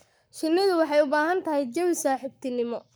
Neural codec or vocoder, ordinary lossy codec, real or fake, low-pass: codec, 44.1 kHz, 7.8 kbps, Pupu-Codec; none; fake; none